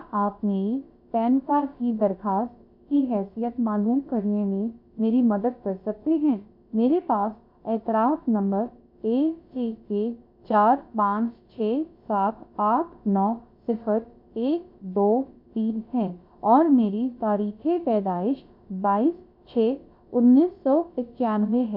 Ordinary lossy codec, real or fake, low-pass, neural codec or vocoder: MP3, 48 kbps; fake; 5.4 kHz; codec, 16 kHz, about 1 kbps, DyCAST, with the encoder's durations